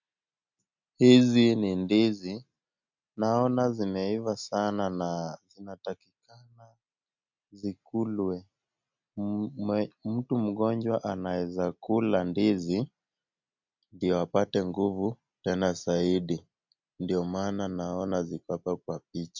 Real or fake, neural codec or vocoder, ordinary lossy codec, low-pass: real; none; MP3, 64 kbps; 7.2 kHz